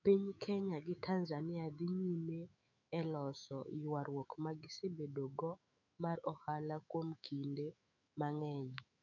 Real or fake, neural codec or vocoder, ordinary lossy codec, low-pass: fake; autoencoder, 48 kHz, 128 numbers a frame, DAC-VAE, trained on Japanese speech; none; 7.2 kHz